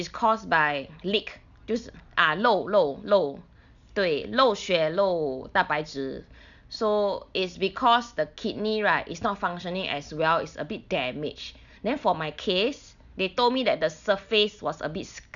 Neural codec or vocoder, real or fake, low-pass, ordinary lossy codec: none; real; 7.2 kHz; none